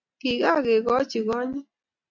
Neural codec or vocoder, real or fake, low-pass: none; real; 7.2 kHz